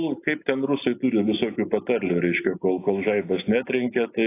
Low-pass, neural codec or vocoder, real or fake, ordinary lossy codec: 3.6 kHz; none; real; AAC, 24 kbps